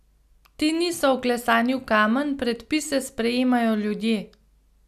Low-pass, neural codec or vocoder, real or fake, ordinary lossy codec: 14.4 kHz; none; real; none